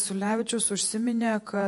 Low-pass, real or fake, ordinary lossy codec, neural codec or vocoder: 14.4 kHz; fake; MP3, 48 kbps; vocoder, 48 kHz, 128 mel bands, Vocos